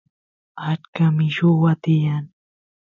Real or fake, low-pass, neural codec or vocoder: real; 7.2 kHz; none